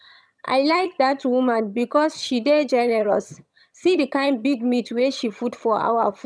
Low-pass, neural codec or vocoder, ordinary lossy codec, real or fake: none; vocoder, 22.05 kHz, 80 mel bands, HiFi-GAN; none; fake